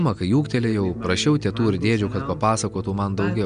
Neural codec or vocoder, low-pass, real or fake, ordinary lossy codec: none; 9.9 kHz; real; AAC, 96 kbps